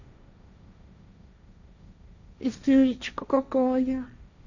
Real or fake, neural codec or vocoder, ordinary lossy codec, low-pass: fake; codec, 16 kHz, 1.1 kbps, Voila-Tokenizer; none; 7.2 kHz